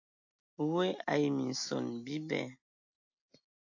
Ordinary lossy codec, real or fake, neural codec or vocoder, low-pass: AAC, 48 kbps; real; none; 7.2 kHz